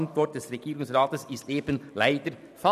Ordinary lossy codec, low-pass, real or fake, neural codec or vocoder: none; 14.4 kHz; real; none